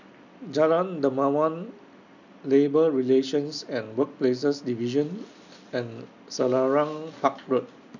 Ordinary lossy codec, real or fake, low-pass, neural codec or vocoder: none; real; 7.2 kHz; none